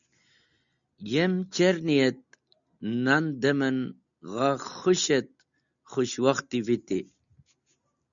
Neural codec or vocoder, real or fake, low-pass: none; real; 7.2 kHz